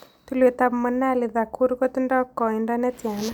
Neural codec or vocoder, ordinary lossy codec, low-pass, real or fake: none; none; none; real